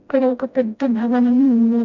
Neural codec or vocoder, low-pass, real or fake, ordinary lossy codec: codec, 16 kHz, 0.5 kbps, FreqCodec, smaller model; 7.2 kHz; fake; none